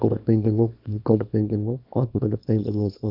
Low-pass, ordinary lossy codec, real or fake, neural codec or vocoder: 5.4 kHz; none; fake; codec, 24 kHz, 0.9 kbps, WavTokenizer, small release